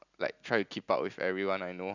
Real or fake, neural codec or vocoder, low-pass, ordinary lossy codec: real; none; 7.2 kHz; none